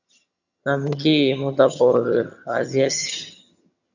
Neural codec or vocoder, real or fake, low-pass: vocoder, 22.05 kHz, 80 mel bands, HiFi-GAN; fake; 7.2 kHz